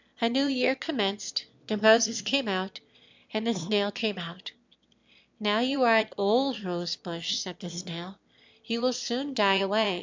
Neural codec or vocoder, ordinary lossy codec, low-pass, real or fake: autoencoder, 22.05 kHz, a latent of 192 numbers a frame, VITS, trained on one speaker; MP3, 64 kbps; 7.2 kHz; fake